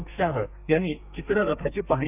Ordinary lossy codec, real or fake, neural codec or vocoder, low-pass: none; fake; codec, 32 kHz, 1.9 kbps, SNAC; 3.6 kHz